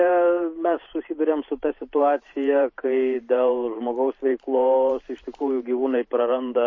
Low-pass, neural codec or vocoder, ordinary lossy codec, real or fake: 7.2 kHz; vocoder, 44.1 kHz, 128 mel bands every 512 samples, BigVGAN v2; MP3, 32 kbps; fake